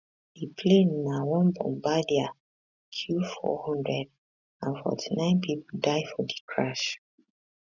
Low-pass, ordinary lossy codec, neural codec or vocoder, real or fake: 7.2 kHz; none; none; real